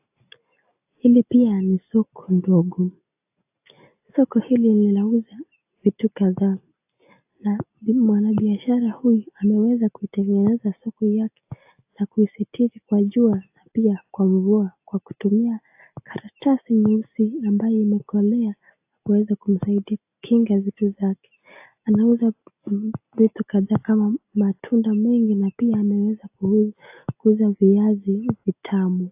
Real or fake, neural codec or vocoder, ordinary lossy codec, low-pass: real; none; AAC, 32 kbps; 3.6 kHz